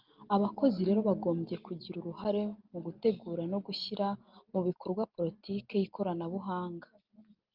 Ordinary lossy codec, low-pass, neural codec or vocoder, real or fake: Opus, 24 kbps; 5.4 kHz; none; real